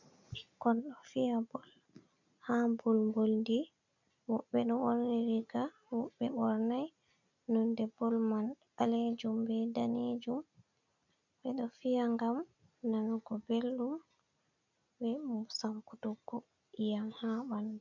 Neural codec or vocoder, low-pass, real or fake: none; 7.2 kHz; real